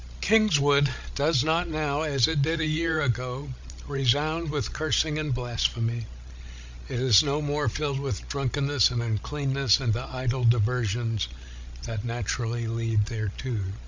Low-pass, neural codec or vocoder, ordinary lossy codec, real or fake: 7.2 kHz; codec, 16 kHz, 16 kbps, FreqCodec, larger model; MP3, 64 kbps; fake